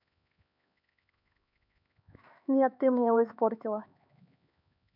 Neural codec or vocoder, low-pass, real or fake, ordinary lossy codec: codec, 16 kHz, 4 kbps, X-Codec, HuBERT features, trained on LibriSpeech; 5.4 kHz; fake; none